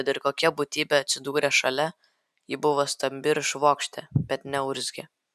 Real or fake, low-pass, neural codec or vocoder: real; 14.4 kHz; none